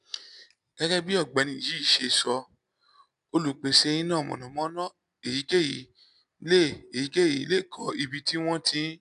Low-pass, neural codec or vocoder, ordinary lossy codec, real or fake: 10.8 kHz; none; none; real